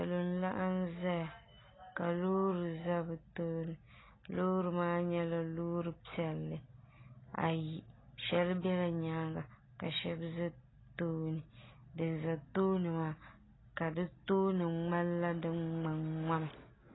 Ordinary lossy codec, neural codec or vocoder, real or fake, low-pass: AAC, 16 kbps; none; real; 7.2 kHz